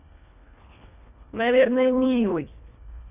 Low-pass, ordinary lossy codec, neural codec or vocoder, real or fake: 3.6 kHz; none; codec, 24 kHz, 1.5 kbps, HILCodec; fake